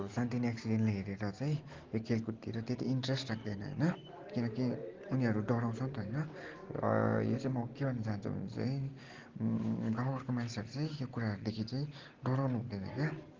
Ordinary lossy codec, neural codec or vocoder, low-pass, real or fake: Opus, 16 kbps; none; 7.2 kHz; real